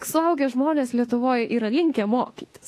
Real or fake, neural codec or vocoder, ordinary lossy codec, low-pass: fake; autoencoder, 48 kHz, 32 numbers a frame, DAC-VAE, trained on Japanese speech; AAC, 48 kbps; 14.4 kHz